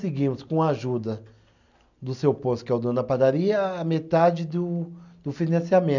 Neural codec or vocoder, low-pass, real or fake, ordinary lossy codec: none; 7.2 kHz; real; none